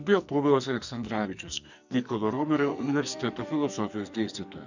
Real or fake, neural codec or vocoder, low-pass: fake; codec, 44.1 kHz, 2.6 kbps, SNAC; 7.2 kHz